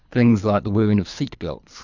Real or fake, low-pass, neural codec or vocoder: fake; 7.2 kHz; codec, 24 kHz, 3 kbps, HILCodec